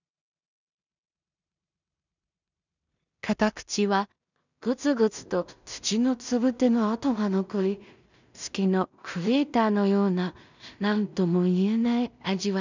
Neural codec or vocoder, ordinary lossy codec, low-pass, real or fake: codec, 16 kHz in and 24 kHz out, 0.4 kbps, LongCat-Audio-Codec, two codebook decoder; none; 7.2 kHz; fake